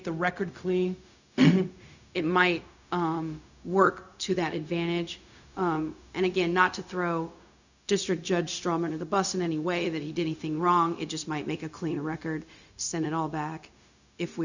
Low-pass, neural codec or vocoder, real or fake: 7.2 kHz; codec, 16 kHz, 0.4 kbps, LongCat-Audio-Codec; fake